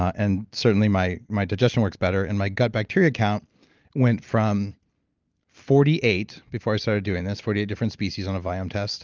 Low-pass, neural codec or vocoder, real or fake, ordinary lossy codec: 7.2 kHz; none; real; Opus, 24 kbps